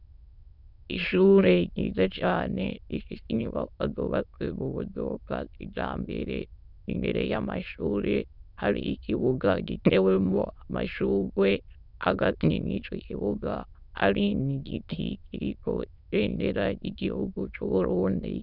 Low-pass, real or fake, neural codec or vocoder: 5.4 kHz; fake; autoencoder, 22.05 kHz, a latent of 192 numbers a frame, VITS, trained on many speakers